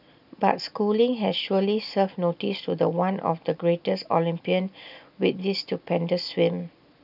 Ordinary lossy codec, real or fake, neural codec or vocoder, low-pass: none; real; none; 5.4 kHz